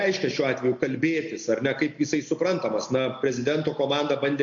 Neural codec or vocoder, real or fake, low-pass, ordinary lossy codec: none; real; 7.2 kHz; AAC, 64 kbps